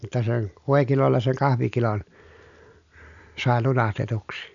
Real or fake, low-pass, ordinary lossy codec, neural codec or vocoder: real; 7.2 kHz; none; none